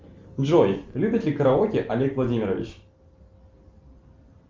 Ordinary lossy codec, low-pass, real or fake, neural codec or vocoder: Opus, 32 kbps; 7.2 kHz; real; none